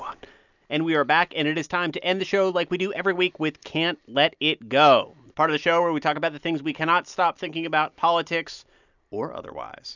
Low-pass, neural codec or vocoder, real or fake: 7.2 kHz; none; real